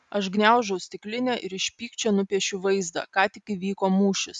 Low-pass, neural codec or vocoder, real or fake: 10.8 kHz; none; real